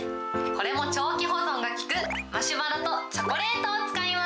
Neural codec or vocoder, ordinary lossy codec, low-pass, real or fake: none; none; none; real